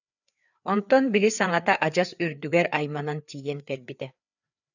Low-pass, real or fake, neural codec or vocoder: 7.2 kHz; fake; codec, 16 kHz, 4 kbps, FreqCodec, larger model